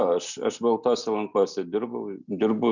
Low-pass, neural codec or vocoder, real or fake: 7.2 kHz; none; real